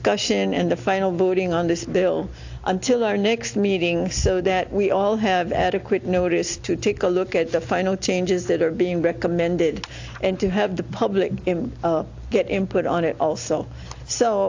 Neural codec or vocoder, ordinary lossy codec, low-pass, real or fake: none; AAC, 48 kbps; 7.2 kHz; real